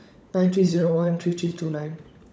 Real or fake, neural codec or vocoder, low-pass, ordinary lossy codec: fake; codec, 16 kHz, 16 kbps, FunCodec, trained on LibriTTS, 50 frames a second; none; none